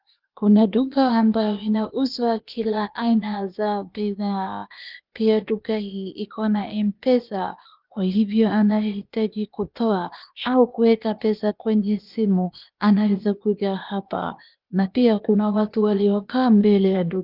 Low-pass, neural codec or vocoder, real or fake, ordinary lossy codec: 5.4 kHz; codec, 16 kHz, 0.8 kbps, ZipCodec; fake; Opus, 24 kbps